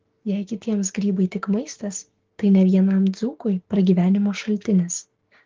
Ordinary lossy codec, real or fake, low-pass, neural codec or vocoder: Opus, 16 kbps; fake; 7.2 kHz; codec, 16 kHz, 6 kbps, DAC